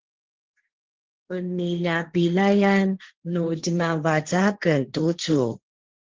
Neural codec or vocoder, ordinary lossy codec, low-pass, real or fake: codec, 16 kHz, 1.1 kbps, Voila-Tokenizer; Opus, 16 kbps; 7.2 kHz; fake